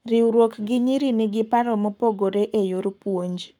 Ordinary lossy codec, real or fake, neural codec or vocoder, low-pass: none; fake; codec, 44.1 kHz, 7.8 kbps, Pupu-Codec; 19.8 kHz